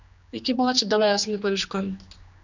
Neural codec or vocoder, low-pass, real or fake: codec, 16 kHz, 2 kbps, X-Codec, HuBERT features, trained on general audio; 7.2 kHz; fake